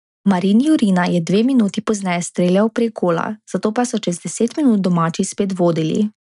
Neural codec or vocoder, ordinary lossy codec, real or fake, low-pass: none; none; real; 10.8 kHz